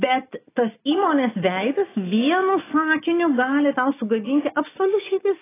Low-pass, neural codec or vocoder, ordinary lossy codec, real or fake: 3.6 kHz; none; AAC, 16 kbps; real